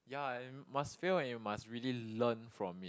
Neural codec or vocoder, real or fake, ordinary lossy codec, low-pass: none; real; none; none